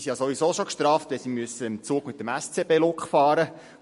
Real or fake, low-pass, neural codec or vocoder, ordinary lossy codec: real; 14.4 kHz; none; MP3, 48 kbps